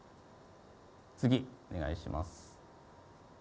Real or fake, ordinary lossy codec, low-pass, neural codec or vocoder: real; none; none; none